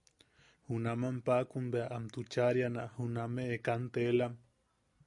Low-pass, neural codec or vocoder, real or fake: 10.8 kHz; none; real